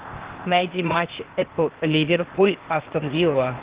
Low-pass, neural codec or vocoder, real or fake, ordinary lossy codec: 3.6 kHz; codec, 16 kHz, 0.8 kbps, ZipCodec; fake; Opus, 16 kbps